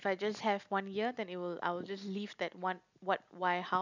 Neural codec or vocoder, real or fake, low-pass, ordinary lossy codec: none; real; 7.2 kHz; none